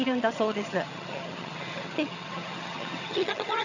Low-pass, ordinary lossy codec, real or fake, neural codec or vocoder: 7.2 kHz; none; fake; vocoder, 22.05 kHz, 80 mel bands, HiFi-GAN